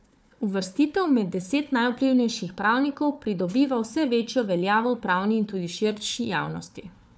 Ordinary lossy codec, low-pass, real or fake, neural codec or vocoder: none; none; fake; codec, 16 kHz, 4 kbps, FunCodec, trained on Chinese and English, 50 frames a second